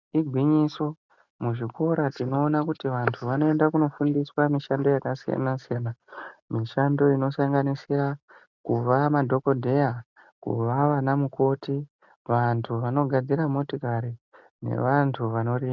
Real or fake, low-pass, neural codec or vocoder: real; 7.2 kHz; none